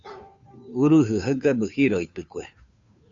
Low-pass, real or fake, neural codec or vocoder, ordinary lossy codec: 7.2 kHz; fake; codec, 16 kHz, 2 kbps, FunCodec, trained on Chinese and English, 25 frames a second; AAC, 64 kbps